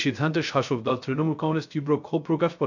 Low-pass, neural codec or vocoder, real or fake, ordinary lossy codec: 7.2 kHz; codec, 16 kHz, 0.3 kbps, FocalCodec; fake; none